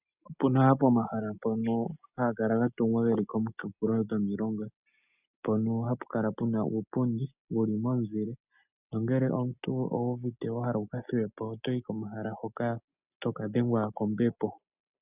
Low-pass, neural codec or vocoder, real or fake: 3.6 kHz; none; real